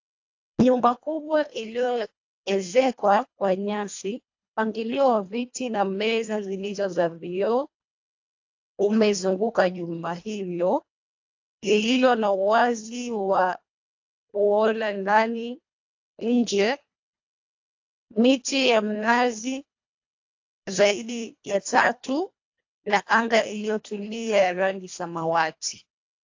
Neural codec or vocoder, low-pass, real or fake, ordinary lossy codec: codec, 24 kHz, 1.5 kbps, HILCodec; 7.2 kHz; fake; AAC, 48 kbps